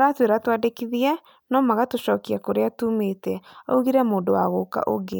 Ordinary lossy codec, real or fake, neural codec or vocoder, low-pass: none; real; none; none